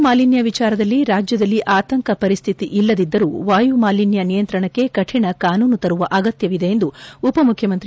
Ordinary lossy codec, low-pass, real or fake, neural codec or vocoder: none; none; real; none